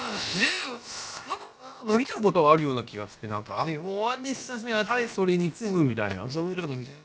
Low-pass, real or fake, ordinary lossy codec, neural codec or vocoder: none; fake; none; codec, 16 kHz, about 1 kbps, DyCAST, with the encoder's durations